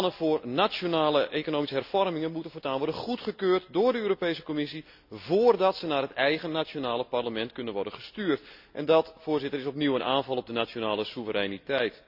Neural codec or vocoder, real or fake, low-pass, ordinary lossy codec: none; real; 5.4 kHz; none